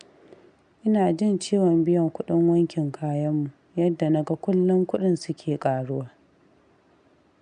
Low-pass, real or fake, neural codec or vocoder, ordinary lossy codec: 9.9 kHz; real; none; none